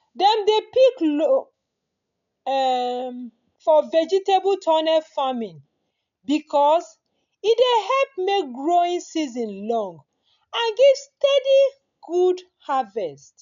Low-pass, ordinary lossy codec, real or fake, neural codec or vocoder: 7.2 kHz; none; real; none